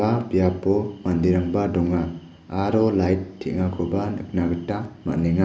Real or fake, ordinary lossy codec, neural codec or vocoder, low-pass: real; none; none; none